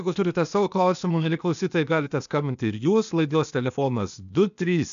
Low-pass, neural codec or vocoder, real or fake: 7.2 kHz; codec, 16 kHz, 0.8 kbps, ZipCodec; fake